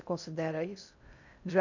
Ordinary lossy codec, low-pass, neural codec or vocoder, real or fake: none; 7.2 kHz; codec, 16 kHz in and 24 kHz out, 0.6 kbps, FocalCodec, streaming, 4096 codes; fake